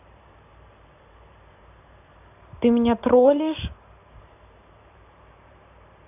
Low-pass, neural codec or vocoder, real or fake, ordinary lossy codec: 3.6 kHz; vocoder, 44.1 kHz, 128 mel bands every 512 samples, BigVGAN v2; fake; none